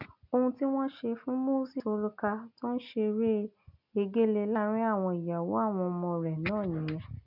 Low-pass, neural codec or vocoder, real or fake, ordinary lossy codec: 5.4 kHz; none; real; none